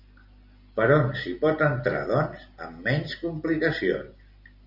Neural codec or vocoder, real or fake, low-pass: none; real; 5.4 kHz